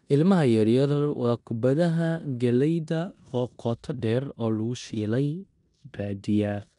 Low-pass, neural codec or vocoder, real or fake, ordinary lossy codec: 10.8 kHz; codec, 16 kHz in and 24 kHz out, 0.9 kbps, LongCat-Audio-Codec, four codebook decoder; fake; none